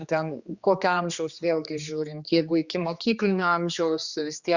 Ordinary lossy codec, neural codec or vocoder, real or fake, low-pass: Opus, 64 kbps; codec, 16 kHz, 2 kbps, X-Codec, HuBERT features, trained on general audio; fake; 7.2 kHz